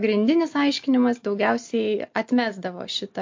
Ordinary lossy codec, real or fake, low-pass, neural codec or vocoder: MP3, 48 kbps; real; 7.2 kHz; none